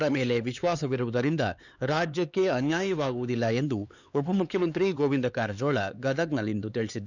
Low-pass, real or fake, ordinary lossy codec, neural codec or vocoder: 7.2 kHz; fake; none; codec, 16 kHz, 4 kbps, X-Codec, HuBERT features, trained on LibriSpeech